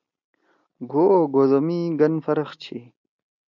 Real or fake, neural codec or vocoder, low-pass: real; none; 7.2 kHz